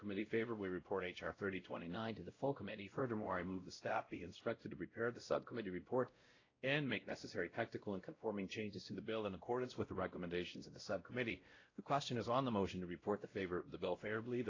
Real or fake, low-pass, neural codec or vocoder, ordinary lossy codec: fake; 7.2 kHz; codec, 16 kHz, 0.5 kbps, X-Codec, WavLM features, trained on Multilingual LibriSpeech; AAC, 32 kbps